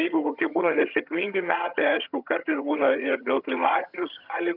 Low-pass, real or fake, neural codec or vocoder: 5.4 kHz; fake; vocoder, 22.05 kHz, 80 mel bands, HiFi-GAN